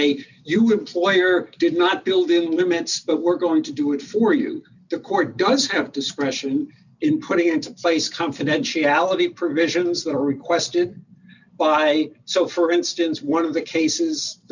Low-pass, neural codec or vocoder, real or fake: 7.2 kHz; none; real